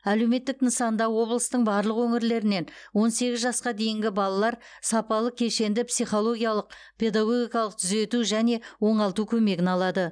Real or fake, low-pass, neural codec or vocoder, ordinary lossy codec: real; 9.9 kHz; none; none